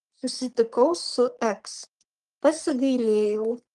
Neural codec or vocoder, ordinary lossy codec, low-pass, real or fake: codec, 44.1 kHz, 3.4 kbps, Pupu-Codec; Opus, 24 kbps; 10.8 kHz; fake